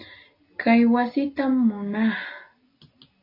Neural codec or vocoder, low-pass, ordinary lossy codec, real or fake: none; 5.4 kHz; AAC, 32 kbps; real